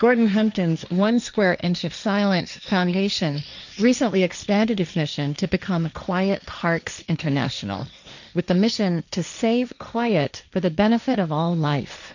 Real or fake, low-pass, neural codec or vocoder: fake; 7.2 kHz; codec, 16 kHz, 1.1 kbps, Voila-Tokenizer